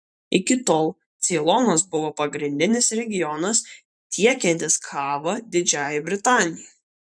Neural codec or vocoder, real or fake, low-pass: vocoder, 44.1 kHz, 128 mel bands every 512 samples, BigVGAN v2; fake; 9.9 kHz